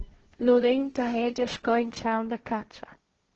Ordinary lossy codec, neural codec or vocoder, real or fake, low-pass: Opus, 16 kbps; codec, 16 kHz, 1.1 kbps, Voila-Tokenizer; fake; 7.2 kHz